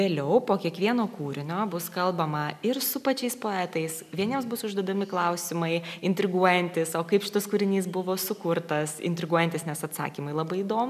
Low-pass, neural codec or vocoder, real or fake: 14.4 kHz; none; real